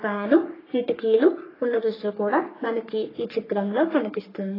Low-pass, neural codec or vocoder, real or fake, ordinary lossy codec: 5.4 kHz; codec, 44.1 kHz, 3.4 kbps, Pupu-Codec; fake; AAC, 24 kbps